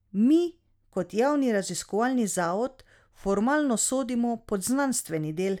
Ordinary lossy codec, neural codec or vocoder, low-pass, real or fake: none; none; 19.8 kHz; real